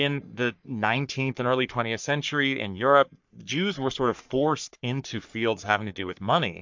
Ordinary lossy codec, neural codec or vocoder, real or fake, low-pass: MP3, 64 kbps; codec, 44.1 kHz, 3.4 kbps, Pupu-Codec; fake; 7.2 kHz